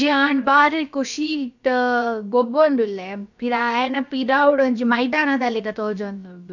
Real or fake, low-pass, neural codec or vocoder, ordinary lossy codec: fake; 7.2 kHz; codec, 16 kHz, about 1 kbps, DyCAST, with the encoder's durations; none